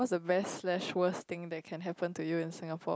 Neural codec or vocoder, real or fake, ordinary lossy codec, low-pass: none; real; none; none